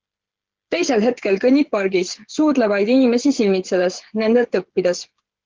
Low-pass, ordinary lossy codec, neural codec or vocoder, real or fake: 7.2 kHz; Opus, 16 kbps; codec, 16 kHz, 8 kbps, FreqCodec, smaller model; fake